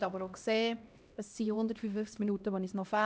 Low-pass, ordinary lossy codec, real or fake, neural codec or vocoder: none; none; fake; codec, 16 kHz, 1 kbps, X-Codec, HuBERT features, trained on LibriSpeech